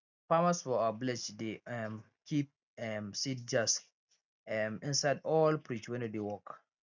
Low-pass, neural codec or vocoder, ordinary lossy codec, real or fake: 7.2 kHz; none; none; real